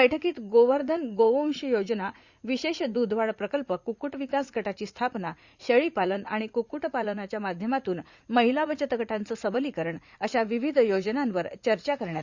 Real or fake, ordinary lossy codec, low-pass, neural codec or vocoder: fake; Opus, 64 kbps; 7.2 kHz; vocoder, 44.1 kHz, 80 mel bands, Vocos